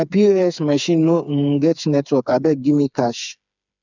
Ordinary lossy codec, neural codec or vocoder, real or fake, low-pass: none; codec, 16 kHz, 4 kbps, FreqCodec, smaller model; fake; 7.2 kHz